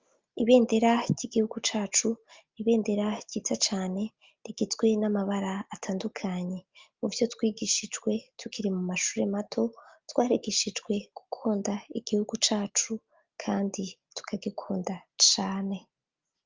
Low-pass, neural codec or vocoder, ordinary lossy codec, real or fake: 7.2 kHz; none; Opus, 32 kbps; real